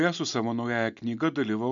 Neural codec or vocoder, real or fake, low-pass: none; real; 7.2 kHz